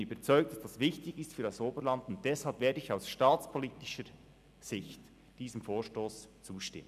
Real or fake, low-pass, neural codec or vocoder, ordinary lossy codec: real; 14.4 kHz; none; none